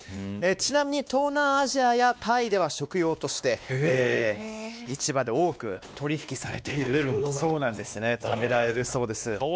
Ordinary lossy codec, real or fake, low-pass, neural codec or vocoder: none; fake; none; codec, 16 kHz, 2 kbps, X-Codec, WavLM features, trained on Multilingual LibriSpeech